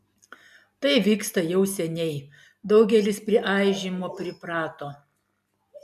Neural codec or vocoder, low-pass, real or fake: none; 14.4 kHz; real